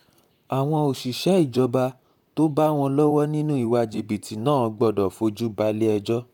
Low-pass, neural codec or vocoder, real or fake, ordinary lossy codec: 19.8 kHz; vocoder, 44.1 kHz, 128 mel bands, Pupu-Vocoder; fake; none